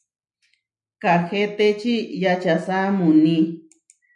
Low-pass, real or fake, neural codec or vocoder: 10.8 kHz; real; none